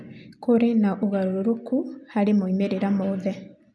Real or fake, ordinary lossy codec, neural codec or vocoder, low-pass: real; none; none; none